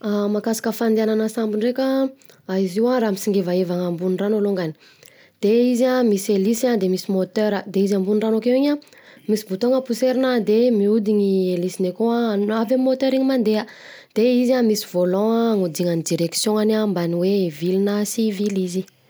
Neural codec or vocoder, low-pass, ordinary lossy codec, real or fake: none; none; none; real